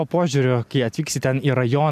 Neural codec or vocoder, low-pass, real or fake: none; 14.4 kHz; real